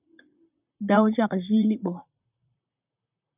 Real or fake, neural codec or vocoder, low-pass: fake; vocoder, 44.1 kHz, 128 mel bands, Pupu-Vocoder; 3.6 kHz